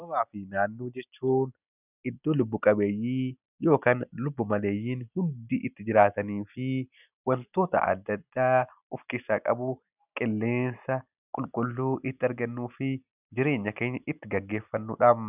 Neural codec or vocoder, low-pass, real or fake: none; 3.6 kHz; real